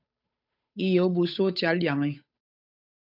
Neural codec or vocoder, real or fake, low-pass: codec, 16 kHz, 8 kbps, FunCodec, trained on Chinese and English, 25 frames a second; fake; 5.4 kHz